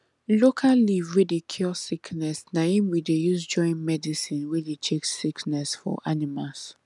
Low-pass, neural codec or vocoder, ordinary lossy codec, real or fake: none; none; none; real